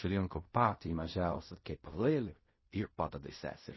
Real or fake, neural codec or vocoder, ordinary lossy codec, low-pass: fake; codec, 16 kHz in and 24 kHz out, 0.4 kbps, LongCat-Audio-Codec, fine tuned four codebook decoder; MP3, 24 kbps; 7.2 kHz